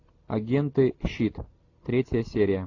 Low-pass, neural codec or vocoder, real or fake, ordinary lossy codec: 7.2 kHz; none; real; AAC, 48 kbps